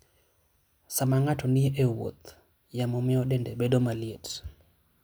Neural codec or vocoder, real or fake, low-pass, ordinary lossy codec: none; real; none; none